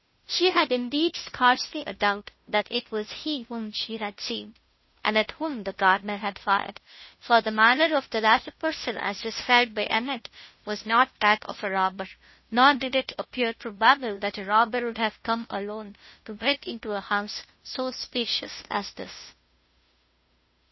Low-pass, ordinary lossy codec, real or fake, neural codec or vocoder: 7.2 kHz; MP3, 24 kbps; fake; codec, 16 kHz, 0.5 kbps, FunCodec, trained on Chinese and English, 25 frames a second